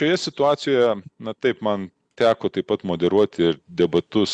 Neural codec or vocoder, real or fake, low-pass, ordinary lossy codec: none; real; 9.9 kHz; Opus, 64 kbps